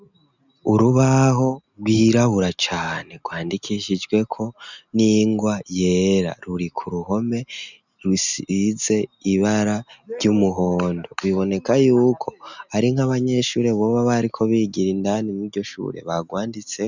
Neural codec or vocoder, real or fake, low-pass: none; real; 7.2 kHz